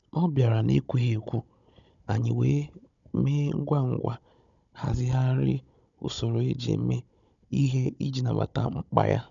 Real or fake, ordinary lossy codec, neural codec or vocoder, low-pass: fake; none; codec, 16 kHz, 16 kbps, FunCodec, trained on Chinese and English, 50 frames a second; 7.2 kHz